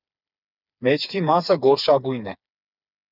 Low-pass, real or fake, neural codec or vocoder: 5.4 kHz; fake; codec, 16 kHz, 4 kbps, FreqCodec, smaller model